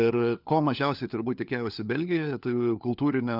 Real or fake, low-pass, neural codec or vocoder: fake; 5.4 kHz; codec, 16 kHz, 4 kbps, FunCodec, trained on LibriTTS, 50 frames a second